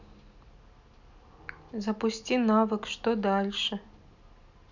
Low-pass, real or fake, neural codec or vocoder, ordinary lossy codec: 7.2 kHz; real; none; none